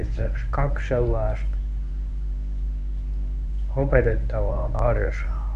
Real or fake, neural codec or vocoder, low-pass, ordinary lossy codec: fake; codec, 24 kHz, 0.9 kbps, WavTokenizer, medium speech release version 1; 10.8 kHz; none